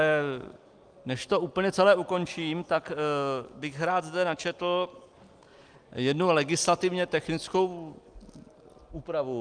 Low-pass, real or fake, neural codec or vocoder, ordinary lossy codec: 9.9 kHz; real; none; Opus, 32 kbps